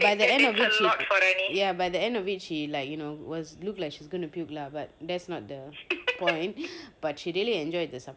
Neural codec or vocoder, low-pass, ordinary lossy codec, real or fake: none; none; none; real